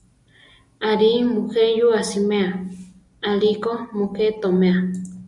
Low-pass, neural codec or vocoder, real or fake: 10.8 kHz; none; real